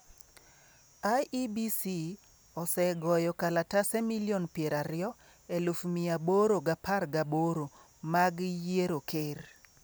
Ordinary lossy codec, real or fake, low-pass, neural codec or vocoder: none; real; none; none